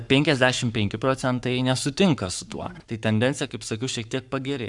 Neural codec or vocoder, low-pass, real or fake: codec, 44.1 kHz, 7.8 kbps, Pupu-Codec; 10.8 kHz; fake